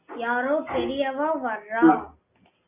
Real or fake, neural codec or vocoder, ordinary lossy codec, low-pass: real; none; Opus, 64 kbps; 3.6 kHz